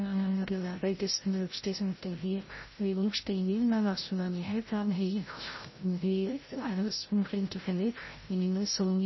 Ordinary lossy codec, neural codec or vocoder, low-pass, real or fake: MP3, 24 kbps; codec, 16 kHz, 0.5 kbps, FreqCodec, larger model; 7.2 kHz; fake